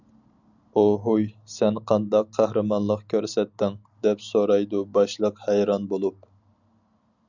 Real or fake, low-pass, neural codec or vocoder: real; 7.2 kHz; none